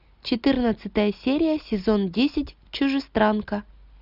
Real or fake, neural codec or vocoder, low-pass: real; none; 5.4 kHz